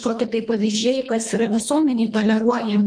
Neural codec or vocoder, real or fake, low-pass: codec, 24 kHz, 1.5 kbps, HILCodec; fake; 9.9 kHz